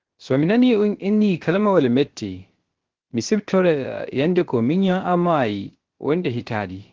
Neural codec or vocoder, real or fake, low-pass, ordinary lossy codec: codec, 16 kHz, about 1 kbps, DyCAST, with the encoder's durations; fake; 7.2 kHz; Opus, 16 kbps